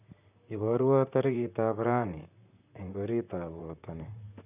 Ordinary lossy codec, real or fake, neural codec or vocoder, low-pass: none; fake; vocoder, 44.1 kHz, 128 mel bands, Pupu-Vocoder; 3.6 kHz